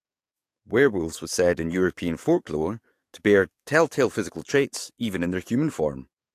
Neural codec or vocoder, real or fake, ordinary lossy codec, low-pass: codec, 44.1 kHz, 7.8 kbps, DAC; fake; AAC, 64 kbps; 14.4 kHz